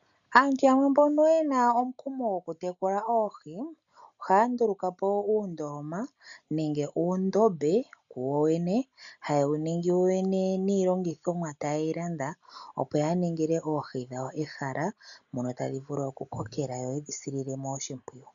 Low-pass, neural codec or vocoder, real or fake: 7.2 kHz; none; real